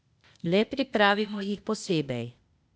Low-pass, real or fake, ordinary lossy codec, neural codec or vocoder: none; fake; none; codec, 16 kHz, 0.8 kbps, ZipCodec